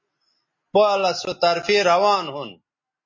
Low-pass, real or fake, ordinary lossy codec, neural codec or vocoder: 7.2 kHz; real; MP3, 32 kbps; none